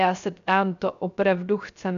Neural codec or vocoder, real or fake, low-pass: codec, 16 kHz, 0.3 kbps, FocalCodec; fake; 7.2 kHz